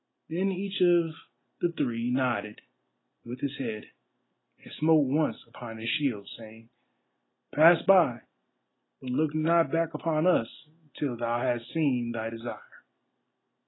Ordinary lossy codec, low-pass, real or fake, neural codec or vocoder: AAC, 16 kbps; 7.2 kHz; real; none